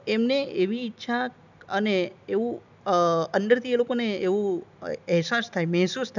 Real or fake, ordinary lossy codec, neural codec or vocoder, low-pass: real; none; none; 7.2 kHz